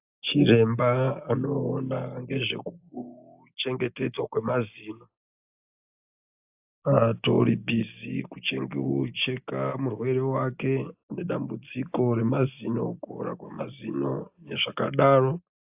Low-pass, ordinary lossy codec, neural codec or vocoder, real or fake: 3.6 kHz; AAC, 32 kbps; none; real